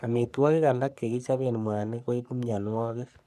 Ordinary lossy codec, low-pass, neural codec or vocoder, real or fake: none; 14.4 kHz; codec, 44.1 kHz, 3.4 kbps, Pupu-Codec; fake